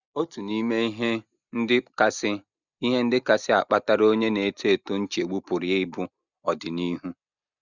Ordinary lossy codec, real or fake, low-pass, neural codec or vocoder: none; real; 7.2 kHz; none